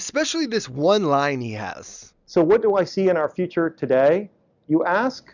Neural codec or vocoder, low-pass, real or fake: none; 7.2 kHz; real